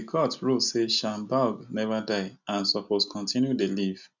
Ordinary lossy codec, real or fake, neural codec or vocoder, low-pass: none; real; none; 7.2 kHz